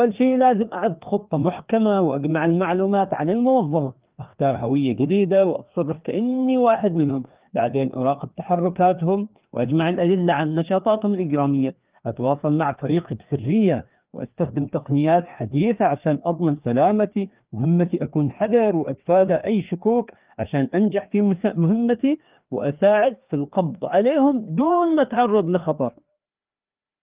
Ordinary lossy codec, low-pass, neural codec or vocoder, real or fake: Opus, 32 kbps; 3.6 kHz; codec, 16 kHz, 2 kbps, FreqCodec, larger model; fake